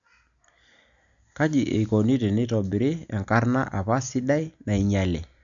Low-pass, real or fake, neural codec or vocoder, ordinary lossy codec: 7.2 kHz; real; none; none